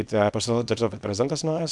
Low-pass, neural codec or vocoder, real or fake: 10.8 kHz; codec, 24 kHz, 0.9 kbps, WavTokenizer, small release; fake